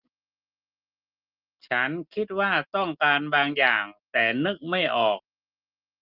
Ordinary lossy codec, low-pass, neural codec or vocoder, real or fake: Opus, 32 kbps; 5.4 kHz; none; real